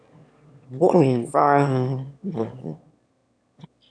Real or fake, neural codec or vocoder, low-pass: fake; autoencoder, 22.05 kHz, a latent of 192 numbers a frame, VITS, trained on one speaker; 9.9 kHz